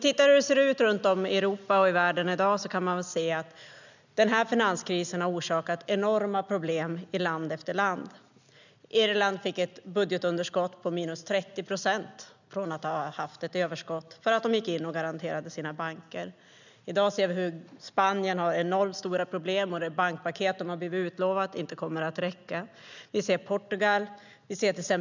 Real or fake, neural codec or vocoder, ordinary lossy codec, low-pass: real; none; none; 7.2 kHz